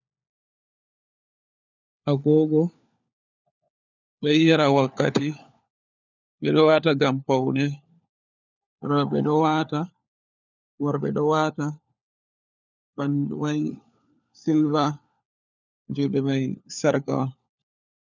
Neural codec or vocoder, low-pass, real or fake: codec, 16 kHz, 4 kbps, FunCodec, trained on LibriTTS, 50 frames a second; 7.2 kHz; fake